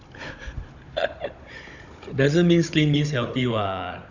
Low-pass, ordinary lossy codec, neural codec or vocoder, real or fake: 7.2 kHz; none; codec, 16 kHz, 16 kbps, FunCodec, trained on Chinese and English, 50 frames a second; fake